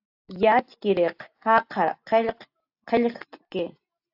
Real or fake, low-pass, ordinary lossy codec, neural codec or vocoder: real; 5.4 kHz; AAC, 48 kbps; none